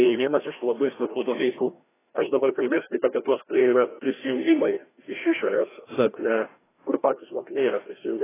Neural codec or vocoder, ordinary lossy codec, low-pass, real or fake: codec, 16 kHz, 1 kbps, FreqCodec, larger model; AAC, 16 kbps; 3.6 kHz; fake